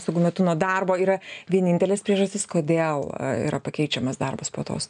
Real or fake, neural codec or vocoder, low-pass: real; none; 9.9 kHz